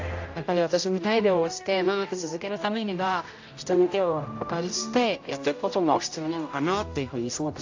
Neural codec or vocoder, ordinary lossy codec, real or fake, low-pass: codec, 16 kHz, 0.5 kbps, X-Codec, HuBERT features, trained on general audio; AAC, 48 kbps; fake; 7.2 kHz